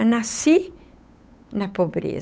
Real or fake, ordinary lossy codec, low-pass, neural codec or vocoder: fake; none; none; codec, 16 kHz, 8 kbps, FunCodec, trained on Chinese and English, 25 frames a second